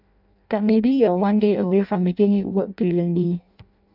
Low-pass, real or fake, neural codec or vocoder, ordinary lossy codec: 5.4 kHz; fake; codec, 16 kHz in and 24 kHz out, 0.6 kbps, FireRedTTS-2 codec; none